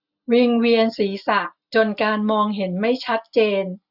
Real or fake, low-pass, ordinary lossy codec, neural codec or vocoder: real; 5.4 kHz; none; none